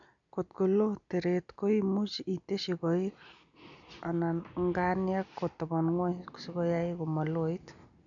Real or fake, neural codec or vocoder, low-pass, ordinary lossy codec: real; none; 7.2 kHz; none